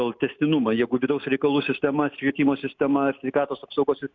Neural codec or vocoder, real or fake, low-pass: none; real; 7.2 kHz